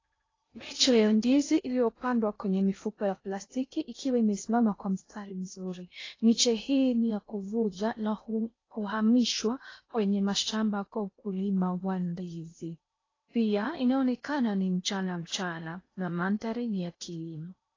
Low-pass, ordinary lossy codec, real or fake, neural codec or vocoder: 7.2 kHz; AAC, 32 kbps; fake; codec, 16 kHz in and 24 kHz out, 0.6 kbps, FocalCodec, streaming, 2048 codes